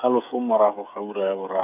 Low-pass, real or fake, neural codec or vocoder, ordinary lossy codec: 3.6 kHz; fake; codec, 16 kHz, 8 kbps, FreqCodec, smaller model; none